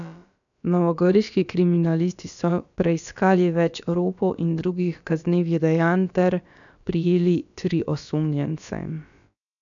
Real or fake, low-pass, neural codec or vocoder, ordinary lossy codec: fake; 7.2 kHz; codec, 16 kHz, about 1 kbps, DyCAST, with the encoder's durations; none